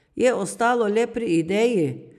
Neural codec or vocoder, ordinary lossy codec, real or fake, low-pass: none; none; real; 14.4 kHz